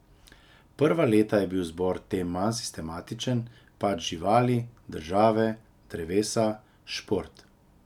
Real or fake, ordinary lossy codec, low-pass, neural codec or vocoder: real; none; 19.8 kHz; none